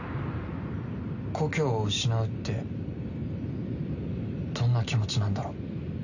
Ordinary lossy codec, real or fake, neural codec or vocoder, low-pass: none; real; none; 7.2 kHz